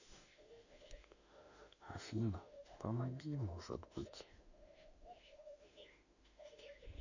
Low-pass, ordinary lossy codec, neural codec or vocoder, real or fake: 7.2 kHz; none; autoencoder, 48 kHz, 32 numbers a frame, DAC-VAE, trained on Japanese speech; fake